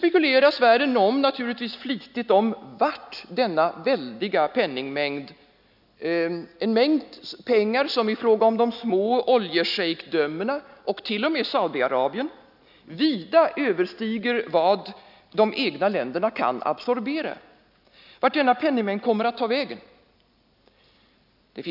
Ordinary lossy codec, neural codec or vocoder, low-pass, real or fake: none; none; 5.4 kHz; real